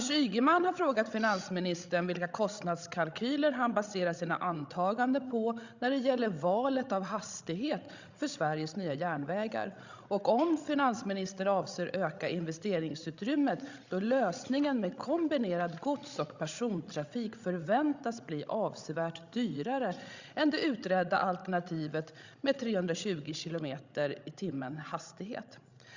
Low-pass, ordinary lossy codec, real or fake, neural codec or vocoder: 7.2 kHz; Opus, 64 kbps; fake; codec, 16 kHz, 16 kbps, FreqCodec, larger model